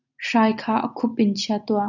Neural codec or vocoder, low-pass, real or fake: none; 7.2 kHz; real